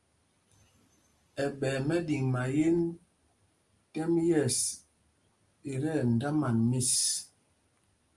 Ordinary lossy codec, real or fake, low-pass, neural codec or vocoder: Opus, 32 kbps; real; 10.8 kHz; none